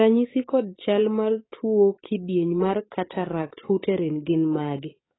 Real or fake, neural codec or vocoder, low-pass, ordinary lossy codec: fake; codec, 44.1 kHz, 7.8 kbps, DAC; 7.2 kHz; AAC, 16 kbps